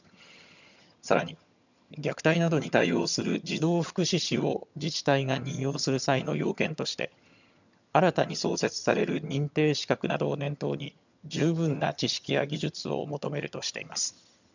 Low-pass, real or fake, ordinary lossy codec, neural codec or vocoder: 7.2 kHz; fake; none; vocoder, 22.05 kHz, 80 mel bands, HiFi-GAN